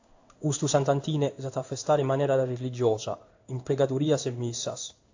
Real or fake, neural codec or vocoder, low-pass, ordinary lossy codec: fake; codec, 16 kHz in and 24 kHz out, 1 kbps, XY-Tokenizer; 7.2 kHz; AAC, 48 kbps